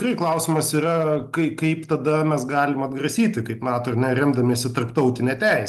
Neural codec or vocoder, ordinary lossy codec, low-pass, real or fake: none; Opus, 32 kbps; 14.4 kHz; real